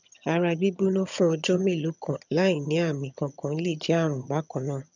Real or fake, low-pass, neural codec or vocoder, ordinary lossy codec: fake; 7.2 kHz; vocoder, 22.05 kHz, 80 mel bands, HiFi-GAN; none